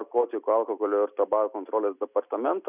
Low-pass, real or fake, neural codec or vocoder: 3.6 kHz; real; none